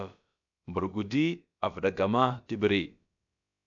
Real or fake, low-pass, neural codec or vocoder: fake; 7.2 kHz; codec, 16 kHz, about 1 kbps, DyCAST, with the encoder's durations